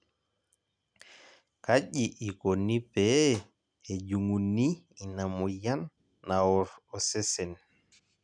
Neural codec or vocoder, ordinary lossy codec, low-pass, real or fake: none; none; 9.9 kHz; real